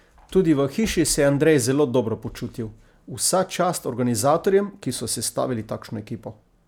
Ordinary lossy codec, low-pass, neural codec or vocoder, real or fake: none; none; none; real